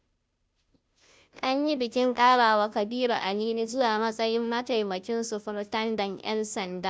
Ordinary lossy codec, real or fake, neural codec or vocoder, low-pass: none; fake; codec, 16 kHz, 0.5 kbps, FunCodec, trained on Chinese and English, 25 frames a second; none